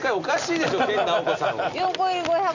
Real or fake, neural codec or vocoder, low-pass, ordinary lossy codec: real; none; 7.2 kHz; none